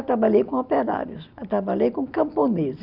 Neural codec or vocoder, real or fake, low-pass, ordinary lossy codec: none; real; 5.4 kHz; none